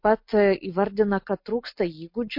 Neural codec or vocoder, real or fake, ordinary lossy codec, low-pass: none; real; MP3, 32 kbps; 5.4 kHz